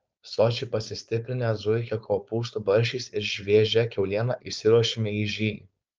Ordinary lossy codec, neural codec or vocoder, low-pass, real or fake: Opus, 24 kbps; codec, 16 kHz, 4.8 kbps, FACodec; 7.2 kHz; fake